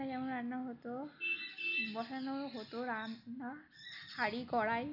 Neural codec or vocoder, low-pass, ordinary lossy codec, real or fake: none; 5.4 kHz; none; real